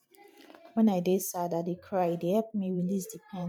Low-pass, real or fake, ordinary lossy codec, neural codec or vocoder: 19.8 kHz; fake; none; vocoder, 44.1 kHz, 128 mel bands every 512 samples, BigVGAN v2